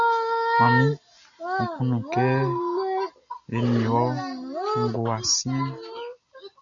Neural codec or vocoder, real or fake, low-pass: none; real; 7.2 kHz